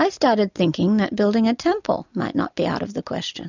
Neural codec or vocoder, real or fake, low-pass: none; real; 7.2 kHz